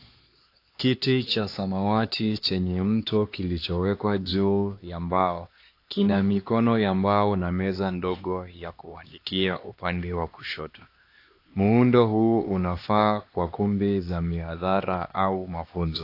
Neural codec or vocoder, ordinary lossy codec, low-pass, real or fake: codec, 16 kHz, 2 kbps, X-Codec, HuBERT features, trained on LibriSpeech; AAC, 32 kbps; 5.4 kHz; fake